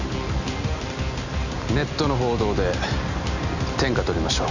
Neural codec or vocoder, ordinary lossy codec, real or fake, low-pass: none; none; real; 7.2 kHz